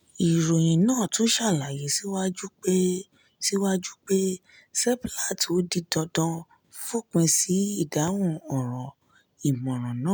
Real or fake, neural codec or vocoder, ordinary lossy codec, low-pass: real; none; none; none